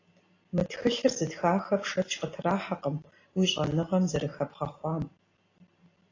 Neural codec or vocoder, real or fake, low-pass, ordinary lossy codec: none; real; 7.2 kHz; AAC, 32 kbps